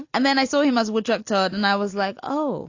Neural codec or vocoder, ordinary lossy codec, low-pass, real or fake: none; AAC, 48 kbps; 7.2 kHz; real